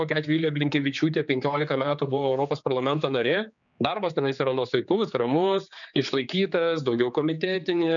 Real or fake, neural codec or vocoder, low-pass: fake; codec, 16 kHz, 4 kbps, X-Codec, HuBERT features, trained on general audio; 7.2 kHz